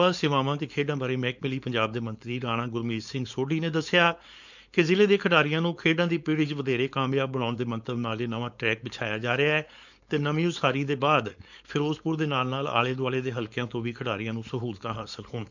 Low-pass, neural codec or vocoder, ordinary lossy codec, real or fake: 7.2 kHz; codec, 16 kHz, 4.8 kbps, FACodec; none; fake